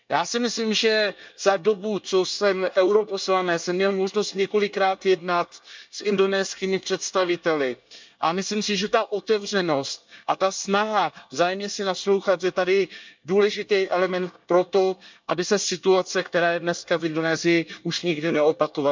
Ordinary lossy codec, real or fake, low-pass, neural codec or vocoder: MP3, 64 kbps; fake; 7.2 kHz; codec, 24 kHz, 1 kbps, SNAC